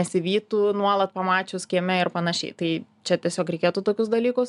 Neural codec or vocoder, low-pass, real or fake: none; 10.8 kHz; real